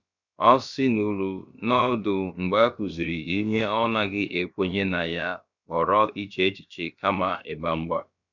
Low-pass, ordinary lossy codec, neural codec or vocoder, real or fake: 7.2 kHz; none; codec, 16 kHz, about 1 kbps, DyCAST, with the encoder's durations; fake